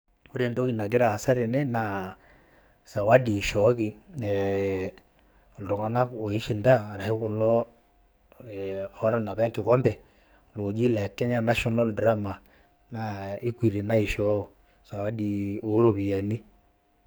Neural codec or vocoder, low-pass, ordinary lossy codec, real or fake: codec, 44.1 kHz, 2.6 kbps, SNAC; none; none; fake